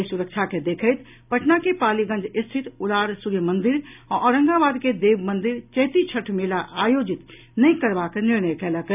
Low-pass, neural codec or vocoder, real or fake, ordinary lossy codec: 3.6 kHz; none; real; none